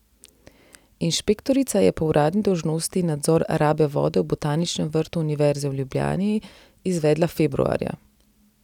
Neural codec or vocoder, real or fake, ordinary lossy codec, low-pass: none; real; none; 19.8 kHz